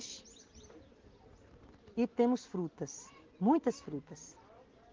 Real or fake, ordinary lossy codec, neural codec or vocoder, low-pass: real; Opus, 16 kbps; none; 7.2 kHz